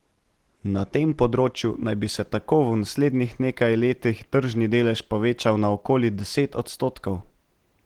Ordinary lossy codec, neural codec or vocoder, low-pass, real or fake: Opus, 16 kbps; none; 19.8 kHz; real